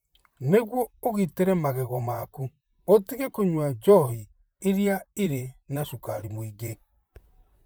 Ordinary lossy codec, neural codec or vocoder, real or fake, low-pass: none; vocoder, 44.1 kHz, 128 mel bands, Pupu-Vocoder; fake; none